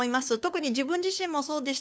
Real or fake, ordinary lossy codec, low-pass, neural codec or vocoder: fake; none; none; codec, 16 kHz, 2 kbps, FunCodec, trained on LibriTTS, 25 frames a second